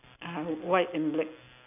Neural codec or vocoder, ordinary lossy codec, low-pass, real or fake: none; none; 3.6 kHz; real